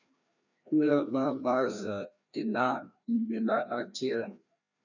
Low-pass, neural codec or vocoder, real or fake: 7.2 kHz; codec, 16 kHz, 1 kbps, FreqCodec, larger model; fake